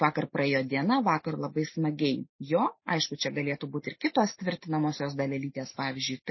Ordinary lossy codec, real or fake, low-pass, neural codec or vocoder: MP3, 24 kbps; real; 7.2 kHz; none